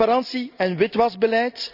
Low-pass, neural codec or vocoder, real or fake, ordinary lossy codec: 5.4 kHz; none; real; none